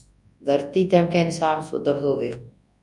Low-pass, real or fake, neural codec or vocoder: 10.8 kHz; fake; codec, 24 kHz, 0.9 kbps, WavTokenizer, large speech release